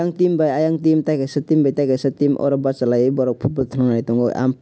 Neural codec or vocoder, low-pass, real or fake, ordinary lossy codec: none; none; real; none